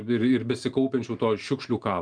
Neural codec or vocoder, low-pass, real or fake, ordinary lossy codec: none; 9.9 kHz; real; Opus, 32 kbps